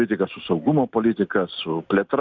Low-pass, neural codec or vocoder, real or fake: 7.2 kHz; none; real